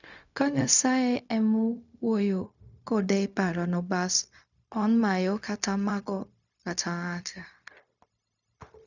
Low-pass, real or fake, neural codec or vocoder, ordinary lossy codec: 7.2 kHz; fake; codec, 16 kHz, 0.4 kbps, LongCat-Audio-Codec; none